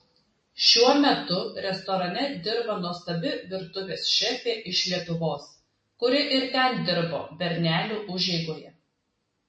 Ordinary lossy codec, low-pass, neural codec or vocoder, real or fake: MP3, 32 kbps; 9.9 kHz; none; real